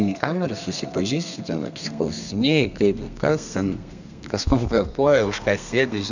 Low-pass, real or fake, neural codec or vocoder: 7.2 kHz; fake; codec, 32 kHz, 1.9 kbps, SNAC